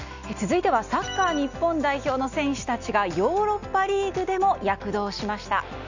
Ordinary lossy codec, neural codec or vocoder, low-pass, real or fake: none; none; 7.2 kHz; real